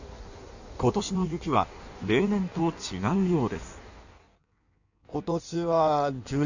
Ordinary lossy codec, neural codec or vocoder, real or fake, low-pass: none; codec, 16 kHz in and 24 kHz out, 1.1 kbps, FireRedTTS-2 codec; fake; 7.2 kHz